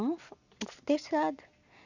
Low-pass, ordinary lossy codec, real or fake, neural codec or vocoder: 7.2 kHz; none; real; none